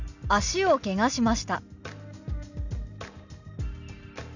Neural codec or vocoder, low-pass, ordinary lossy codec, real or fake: none; 7.2 kHz; none; real